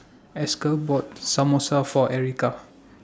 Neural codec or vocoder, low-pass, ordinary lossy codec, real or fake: none; none; none; real